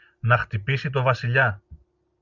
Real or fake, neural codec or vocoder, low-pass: real; none; 7.2 kHz